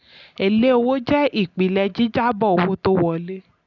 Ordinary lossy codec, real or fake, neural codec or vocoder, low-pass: none; real; none; 7.2 kHz